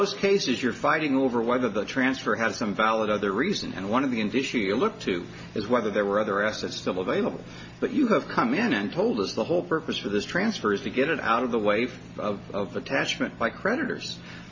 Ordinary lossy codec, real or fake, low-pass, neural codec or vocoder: MP3, 32 kbps; real; 7.2 kHz; none